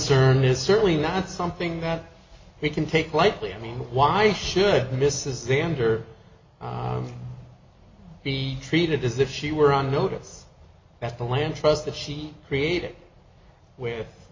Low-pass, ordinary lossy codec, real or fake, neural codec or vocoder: 7.2 kHz; MP3, 32 kbps; real; none